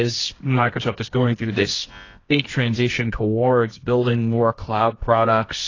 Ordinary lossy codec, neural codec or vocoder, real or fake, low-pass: AAC, 32 kbps; codec, 24 kHz, 0.9 kbps, WavTokenizer, medium music audio release; fake; 7.2 kHz